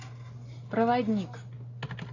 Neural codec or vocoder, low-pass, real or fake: none; 7.2 kHz; real